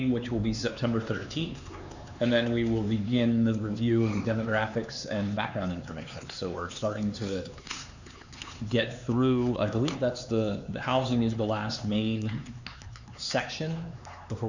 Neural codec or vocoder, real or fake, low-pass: codec, 16 kHz, 4 kbps, X-Codec, HuBERT features, trained on LibriSpeech; fake; 7.2 kHz